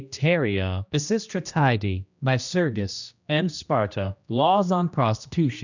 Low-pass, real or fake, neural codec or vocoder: 7.2 kHz; fake; codec, 16 kHz, 1 kbps, X-Codec, HuBERT features, trained on general audio